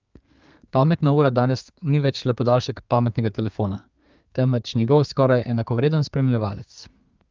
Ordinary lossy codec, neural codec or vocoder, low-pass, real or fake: Opus, 24 kbps; codec, 32 kHz, 1.9 kbps, SNAC; 7.2 kHz; fake